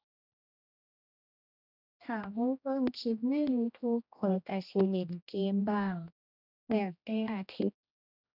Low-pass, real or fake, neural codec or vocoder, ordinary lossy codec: 5.4 kHz; fake; codec, 16 kHz, 1 kbps, X-Codec, HuBERT features, trained on general audio; none